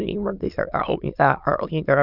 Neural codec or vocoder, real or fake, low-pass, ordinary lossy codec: autoencoder, 22.05 kHz, a latent of 192 numbers a frame, VITS, trained on many speakers; fake; 5.4 kHz; none